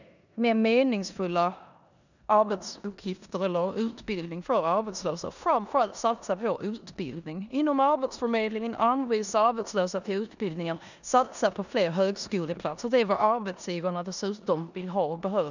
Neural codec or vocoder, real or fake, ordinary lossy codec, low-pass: codec, 16 kHz in and 24 kHz out, 0.9 kbps, LongCat-Audio-Codec, four codebook decoder; fake; none; 7.2 kHz